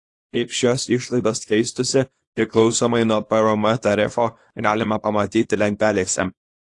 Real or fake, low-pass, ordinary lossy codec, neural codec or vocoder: fake; 10.8 kHz; AAC, 48 kbps; codec, 24 kHz, 0.9 kbps, WavTokenizer, small release